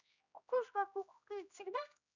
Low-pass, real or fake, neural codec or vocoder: 7.2 kHz; fake; codec, 16 kHz, 1 kbps, X-Codec, HuBERT features, trained on general audio